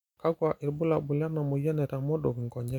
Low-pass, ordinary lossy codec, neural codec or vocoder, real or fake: 19.8 kHz; none; none; real